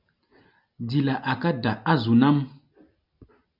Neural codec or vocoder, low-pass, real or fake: none; 5.4 kHz; real